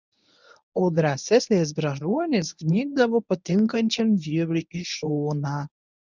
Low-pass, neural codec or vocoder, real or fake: 7.2 kHz; codec, 24 kHz, 0.9 kbps, WavTokenizer, medium speech release version 1; fake